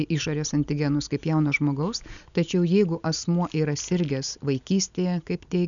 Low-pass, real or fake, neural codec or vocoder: 7.2 kHz; real; none